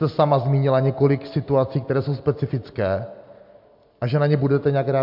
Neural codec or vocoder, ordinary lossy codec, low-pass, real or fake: none; MP3, 48 kbps; 5.4 kHz; real